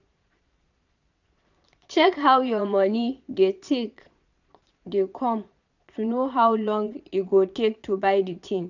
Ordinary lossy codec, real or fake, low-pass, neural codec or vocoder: none; fake; 7.2 kHz; vocoder, 22.05 kHz, 80 mel bands, Vocos